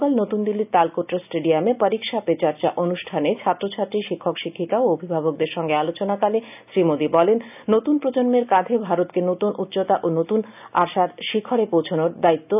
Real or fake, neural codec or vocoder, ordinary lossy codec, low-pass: real; none; none; 3.6 kHz